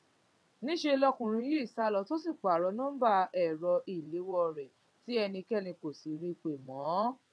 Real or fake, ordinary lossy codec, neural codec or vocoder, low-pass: fake; none; vocoder, 22.05 kHz, 80 mel bands, WaveNeXt; none